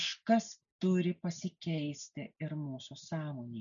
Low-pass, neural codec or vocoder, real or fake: 7.2 kHz; none; real